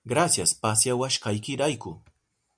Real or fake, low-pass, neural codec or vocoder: real; 9.9 kHz; none